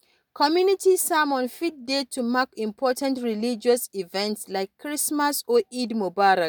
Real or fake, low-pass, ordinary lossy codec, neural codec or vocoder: real; none; none; none